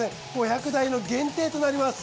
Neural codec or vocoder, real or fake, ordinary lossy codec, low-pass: none; real; none; none